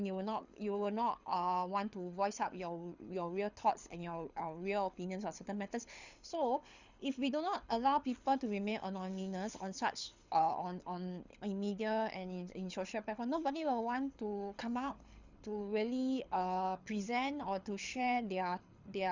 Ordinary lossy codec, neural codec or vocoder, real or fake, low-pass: Opus, 64 kbps; codec, 24 kHz, 6 kbps, HILCodec; fake; 7.2 kHz